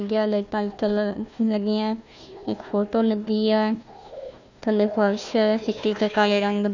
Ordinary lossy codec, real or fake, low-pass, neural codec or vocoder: none; fake; 7.2 kHz; codec, 16 kHz, 1 kbps, FunCodec, trained on Chinese and English, 50 frames a second